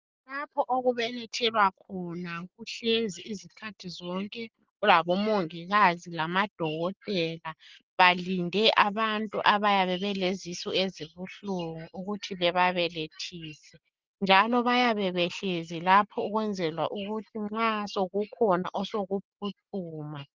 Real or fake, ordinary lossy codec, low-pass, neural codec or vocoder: real; Opus, 32 kbps; 7.2 kHz; none